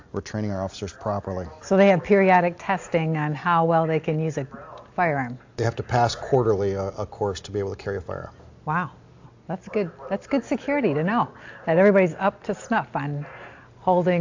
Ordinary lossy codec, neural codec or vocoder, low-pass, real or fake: AAC, 48 kbps; none; 7.2 kHz; real